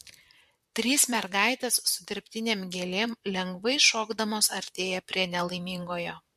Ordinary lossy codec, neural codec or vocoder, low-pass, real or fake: MP3, 64 kbps; vocoder, 44.1 kHz, 128 mel bands, Pupu-Vocoder; 14.4 kHz; fake